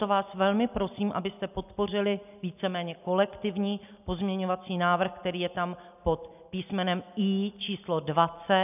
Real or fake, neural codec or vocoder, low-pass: real; none; 3.6 kHz